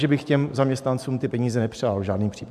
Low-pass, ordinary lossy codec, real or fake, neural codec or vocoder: 14.4 kHz; MP3, 96 kbps; fake; vocoder, 44.1 kHz, 128 mel bands every 512 samples, BigVGAN v2